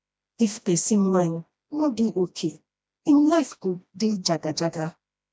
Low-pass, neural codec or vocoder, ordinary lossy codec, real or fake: none; codec, 16 kHz, 1 kbps, FreqCodec, smaller model; none; fake